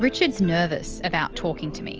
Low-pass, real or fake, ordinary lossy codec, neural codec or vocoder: 7.2 kHz; real; Opus, 24 kbps; none